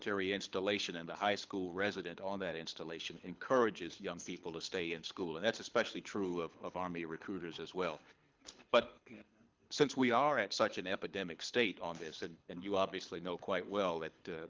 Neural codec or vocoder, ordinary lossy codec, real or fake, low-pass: codec, 24 kHz, 6 kbps, HILCodec; Opus, 32 kbps; fake; 7.2 kHz